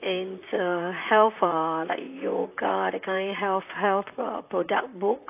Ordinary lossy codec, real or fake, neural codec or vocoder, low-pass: AAC, 32 kbps; fake; vocoder, 44.1 kHz, 128 mel bands, Pupu-Vocoder; 3.6 kHz